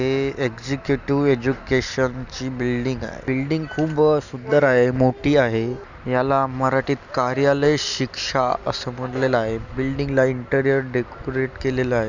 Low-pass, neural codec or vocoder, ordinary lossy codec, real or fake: 7.2 kHz; none; none; real